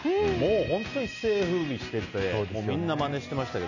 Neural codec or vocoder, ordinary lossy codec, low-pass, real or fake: none; none; 7.2 kHz; real